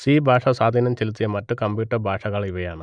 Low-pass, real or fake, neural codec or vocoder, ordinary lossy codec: 9.9 kHz; real; none; none